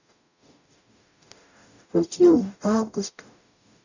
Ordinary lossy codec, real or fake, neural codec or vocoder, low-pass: none; fake; codec, 44.1 kHz, 0.9 kbps, DAC; 7.2 kHz